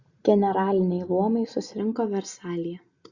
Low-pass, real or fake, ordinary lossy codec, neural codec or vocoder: 7.2 kHz; real; Opus, 64 kbps; none